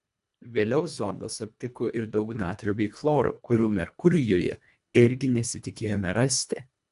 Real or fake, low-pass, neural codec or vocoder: fake; 10.8 kHz; codec, 24 kHz, 1.5 kbps, HILCodec